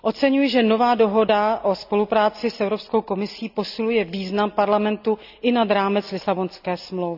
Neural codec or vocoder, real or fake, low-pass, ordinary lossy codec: none; real; 5.4 kHz; none